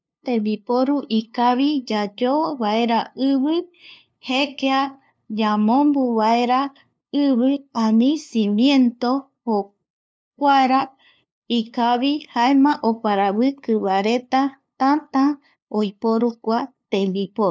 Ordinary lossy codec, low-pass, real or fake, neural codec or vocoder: none; none; fake; codec, 16 kHz, 2 kbps, FunCodec, trained on LibriTTS, 25 frames a second